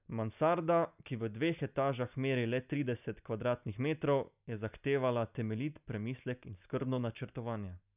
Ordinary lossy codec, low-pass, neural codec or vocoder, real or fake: none; 3.6 kHz; none; real